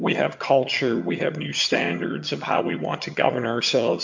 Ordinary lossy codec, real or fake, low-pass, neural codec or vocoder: MP3, 48 kbps; fake; 7.2 kHz; vocoder, 22.05 kHz, 80 mel bands, HiFi-GAN